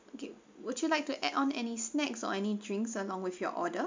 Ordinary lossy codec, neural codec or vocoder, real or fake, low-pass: MP3, 64 kbps; none; real; 7.2 kHz